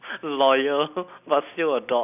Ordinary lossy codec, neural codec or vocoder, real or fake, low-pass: none; none; real; 3.6 kHz